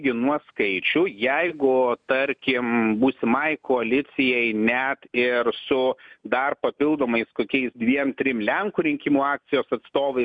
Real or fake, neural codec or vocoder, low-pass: real; none; 9.9 kHz